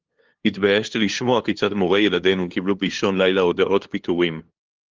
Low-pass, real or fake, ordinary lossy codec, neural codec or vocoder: 7.2 kHz; fake; Opus, 16 kbps; codec, 16 kHz, 2 kbps, FunCodec, trained on LibriTTS, 25 frames a second